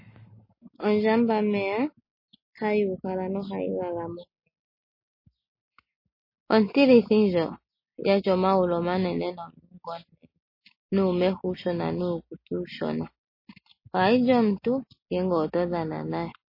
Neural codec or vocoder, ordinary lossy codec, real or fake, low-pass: none; MP3, 24 kbps; real; 5.4 kHz